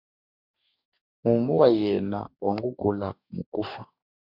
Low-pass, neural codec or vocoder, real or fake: 5.4 kHz; codec, 44.1 kHz, 2.6 kbps, DAC; fake